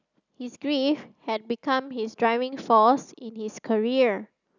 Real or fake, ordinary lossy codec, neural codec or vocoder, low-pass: real; none; none; 7.2 kHz